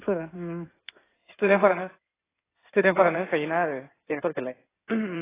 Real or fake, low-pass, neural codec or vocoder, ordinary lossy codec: fake; 3.6 kHz; codec, 16 kHz in and 24 kHz out, 2.2 kbps, FireRedTTS-2 codec; AAC, 16 kbps